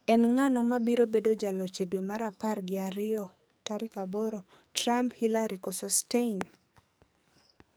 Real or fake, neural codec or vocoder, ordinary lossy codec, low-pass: fake; codec, 44.1 kHz, 2.6 kbps, SNAC; none; none